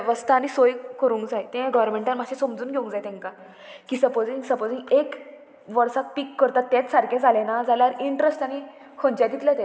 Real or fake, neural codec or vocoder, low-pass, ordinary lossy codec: real; none; none; none